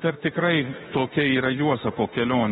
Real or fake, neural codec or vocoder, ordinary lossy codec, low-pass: fake; autoencoder, 48 kHz, 32 numbers a frame, DAC-VAE, trained on Japanese speech; AAC, 16 kbps; 19.8 kHz